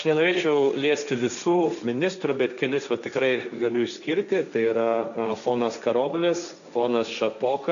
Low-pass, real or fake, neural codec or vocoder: 7.2 kHz; fake; codec, 16 kHz, 1.1 kbps, Voila-Tokenizer